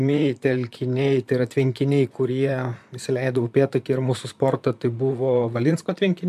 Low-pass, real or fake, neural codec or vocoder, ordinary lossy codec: 14.4 kHz; fake; vocoder, 44.1 kHz, 128 mel bands, Pupu-Vocoder; AAC, 96 kbps